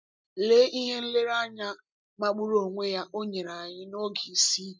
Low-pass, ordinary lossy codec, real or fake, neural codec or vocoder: none; none; real; none